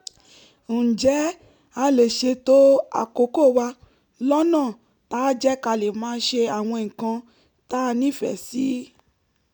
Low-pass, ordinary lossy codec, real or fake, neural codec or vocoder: 19.8 kHz; none; real; none